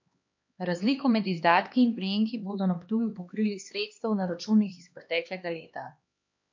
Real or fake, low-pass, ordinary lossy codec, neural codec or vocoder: fake; 7.2 kHz; MP3, 48 kbps; codec, 16 kHz, 2 kbps, X-Codec, HuBERT features, trained on LibriSpeech